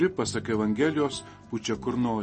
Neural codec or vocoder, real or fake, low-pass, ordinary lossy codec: none; real; 10.8 kHz; MP3, 32 kbps